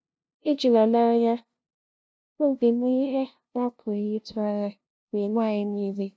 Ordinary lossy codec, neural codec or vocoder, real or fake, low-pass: none; codec, 16 kHz, 0.5 kbps, FunCodec, trained on LibriTTS, 25 frames a second; fake; none